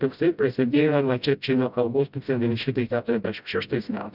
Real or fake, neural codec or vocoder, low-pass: fake; codec, 16 kHz, 0.5 kbps, FreqCodec, smaller model; 5.4 kHz